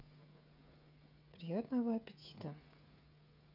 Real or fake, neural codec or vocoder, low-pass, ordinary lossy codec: fake; codec, 16 kHz, 16 kbps, FreqCodec, smaller model; 5.4 kHz; none